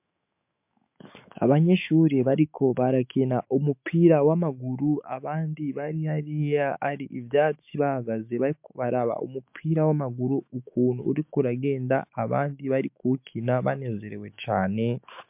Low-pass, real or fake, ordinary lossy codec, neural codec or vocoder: 3.6 kHz; fake; MP3, 32 kbps; autoencoder, 48 kHz, 128 numbers a frame, DAC-VAE, trained on Japanese speech